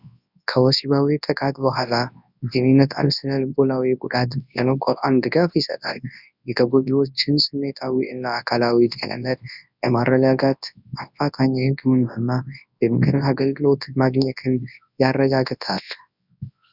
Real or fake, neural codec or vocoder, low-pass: fake; codec, 24 kHz, 0.9 kbps, WavTokenizer, large speech release; 5.4 kHz